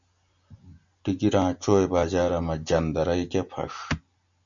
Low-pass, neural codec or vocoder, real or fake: 7.2 kHz; none; real